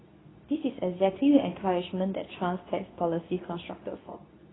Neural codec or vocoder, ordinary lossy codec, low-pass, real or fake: codec, 24 kHz, 0.9 kbps, WavTokenizer, medium speech release version 2; AAC, 16 kbps; 7.2 kHz; fake